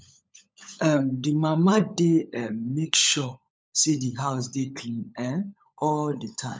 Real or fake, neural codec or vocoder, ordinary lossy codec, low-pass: fake; codec, 16 kHz, 16 kbps, FunCodec, trained on LibriTTS, 50 frames a second; none; none